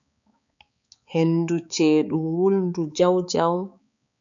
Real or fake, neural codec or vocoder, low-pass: fake; codec, 16 kHz, 4 kbps, X-Codec, HuBERT features, trained on balanced general audio; 7.2 kHz